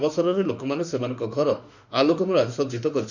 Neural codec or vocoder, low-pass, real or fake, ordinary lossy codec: autoencoder, 48 kHz, 32 numbers a frame, DAC-VAE, trained on Japanese speech; 7.2 kHz; fake; none